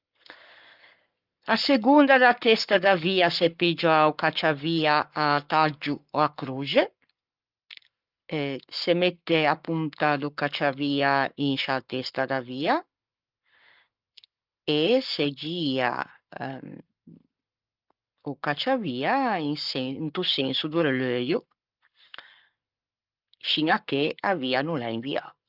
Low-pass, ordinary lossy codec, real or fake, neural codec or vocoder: 5.4 kHz; Opus, 32 kbps; fake; codec, 44.1 kHz, 7.8 kbps, Pupu-Codec